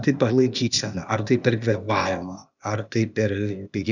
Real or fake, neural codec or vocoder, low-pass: fake; codec, 16 kHz, 0.8 kbps, ZipCodec; 7.2 kHz